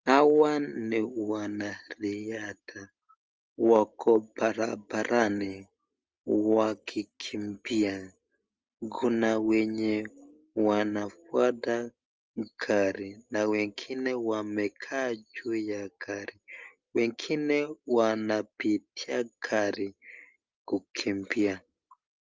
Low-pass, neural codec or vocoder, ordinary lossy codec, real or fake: 7.2 kHz; none; Opus, 24 kbps; real